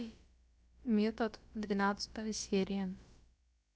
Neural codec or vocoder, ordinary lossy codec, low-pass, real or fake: codec, 16 kHz, about 1 kbps, DyCAST, with the encoder's durations; none; none; fake